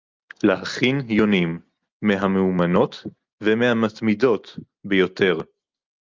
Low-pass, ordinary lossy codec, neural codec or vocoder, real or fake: 7.2 kHz; Opus, 24 kbps; none; real